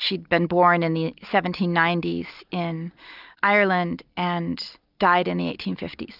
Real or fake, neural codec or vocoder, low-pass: real; none; 5.4 kHz